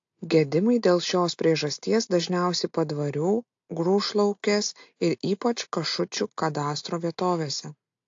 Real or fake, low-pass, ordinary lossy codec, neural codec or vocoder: real; 7.2 kHz; AAC, 48 kbps; none